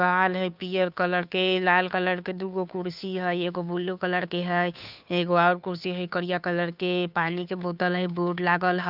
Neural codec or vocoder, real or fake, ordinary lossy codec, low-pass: codec, 16 kHz, 2 kbps, FunCodec, trained on Chinese and English, 25 frames a second; fake; none; 5.4 kHz